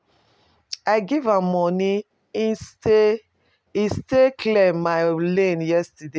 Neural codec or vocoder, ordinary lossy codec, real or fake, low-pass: none; none; real; none